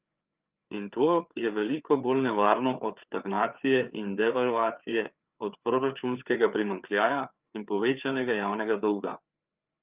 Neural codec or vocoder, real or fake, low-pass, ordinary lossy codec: codec, 16 kHz, 4 kbps, FreqCodec, larger model; fake; 3.6 kHz; Opus, 24 kbps